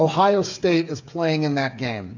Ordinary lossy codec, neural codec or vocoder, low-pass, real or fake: AAC, 48 kbps; codec, 16 kHz, 8 kbps, FreqCodec, smaller model; 7.2 kHz; fake